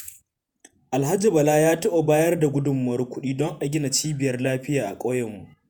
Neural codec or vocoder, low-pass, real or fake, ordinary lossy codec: vocoder, 48 kHz, 128 mel bands, Vocos; none; fake; none